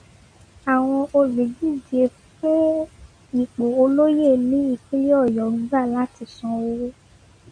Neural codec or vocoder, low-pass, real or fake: none; 9.9 kHz; real